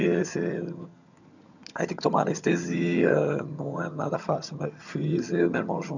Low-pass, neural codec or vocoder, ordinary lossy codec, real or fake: 7.2 kHz; vocoder, 22.05 kHz, 80 mel bands, HiFi-GAN; none; fake